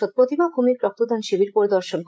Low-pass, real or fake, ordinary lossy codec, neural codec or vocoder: none; fake; none; codec, 16 kHz, 16 kbps, FreqCodec, larger model